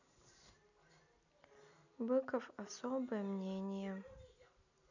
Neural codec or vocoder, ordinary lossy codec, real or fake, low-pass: none; none; real; 7.2 kHz